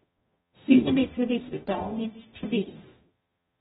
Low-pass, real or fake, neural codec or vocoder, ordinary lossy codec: 19.8 kHz; fake; codec, 44.1 kHz, 0.9 kbps, DAC; AAC, 16 kbps